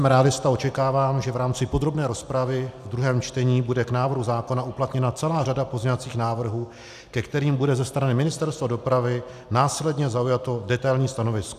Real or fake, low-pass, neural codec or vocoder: real; 14.4 kHz; none